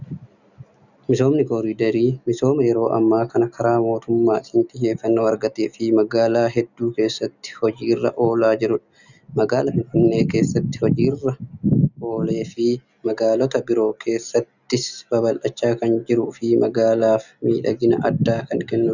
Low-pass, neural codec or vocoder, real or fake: 7.2 kHz; none; real